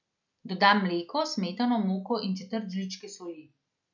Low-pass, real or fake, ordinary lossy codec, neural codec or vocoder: 7.2 kHz; real; none; none